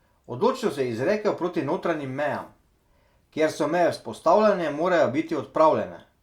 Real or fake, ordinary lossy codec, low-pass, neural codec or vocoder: real; Opus, 64 kbps; 19.8 kHz; none